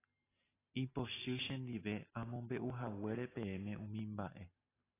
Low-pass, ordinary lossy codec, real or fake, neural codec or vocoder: 3.6 kHz; AAC, 16 kbps; real; none